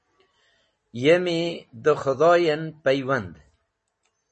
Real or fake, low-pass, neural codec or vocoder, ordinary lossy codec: real; 10.8 kHz; none; MP3, 32 kbps